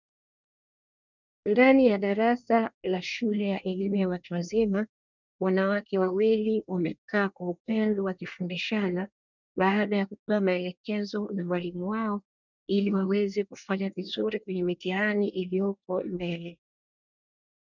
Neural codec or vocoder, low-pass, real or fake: codec, 24 kHz, 1 kbps, SNAC; 7.2 kHz; fake